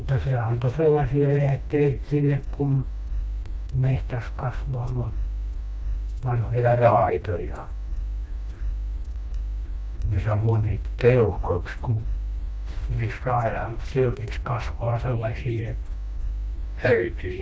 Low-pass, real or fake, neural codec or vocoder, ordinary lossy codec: none; fake; codec, 16 kHz, 1 kbps, FreqCodec, smaller model; none